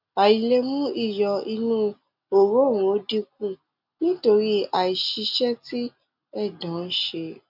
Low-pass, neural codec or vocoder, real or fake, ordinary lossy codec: 5.4 kHz; none; real; none